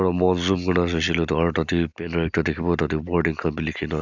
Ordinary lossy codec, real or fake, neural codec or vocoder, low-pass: none; real; none; 7.2 kHz